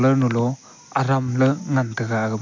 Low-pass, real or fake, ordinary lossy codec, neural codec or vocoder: 7.2 kHz; real; MP3, 48 kbps; none